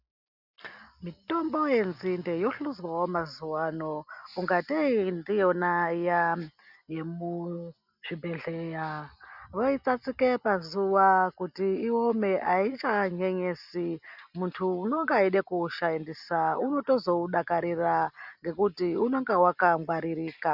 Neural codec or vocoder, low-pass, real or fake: none; 5.4 kHz; real